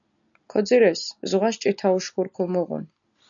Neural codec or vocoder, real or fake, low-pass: none; real; 7.2 kHz